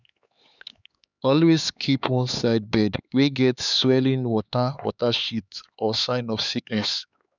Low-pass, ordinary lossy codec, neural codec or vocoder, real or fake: 7.2 kHz; none; codec, 16 kHz, 4 kbps, X-Codec, HuBERT features, trained on LibriSpeech; fake